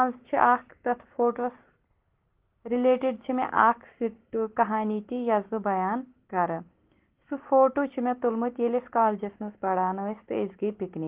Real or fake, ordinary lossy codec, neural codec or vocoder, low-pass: real; Opus, 16 kbps; none; 3.6 kHz